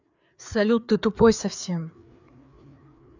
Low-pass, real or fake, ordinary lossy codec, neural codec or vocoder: 7.2 kHz; fake; none; codec, 16 kHz, 4 kbps, FreqCodec, larger model